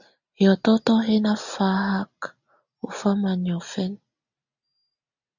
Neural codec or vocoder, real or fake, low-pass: none; real; 7.2 kHz